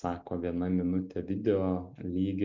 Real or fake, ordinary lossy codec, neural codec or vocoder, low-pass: real; Opus, 64 kbps; none; 7.2 kHz